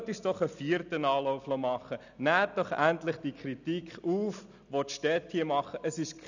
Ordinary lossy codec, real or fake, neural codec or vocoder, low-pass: none; real; none; 7.2 kHz